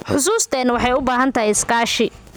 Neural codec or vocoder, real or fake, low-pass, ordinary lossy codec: none; real; none; none